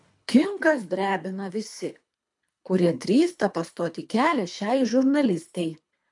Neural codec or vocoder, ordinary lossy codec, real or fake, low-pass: codec, 24 kHz, 3 kbps, HILCodec; MP3, 64 kbps; fake; 10.8 kHz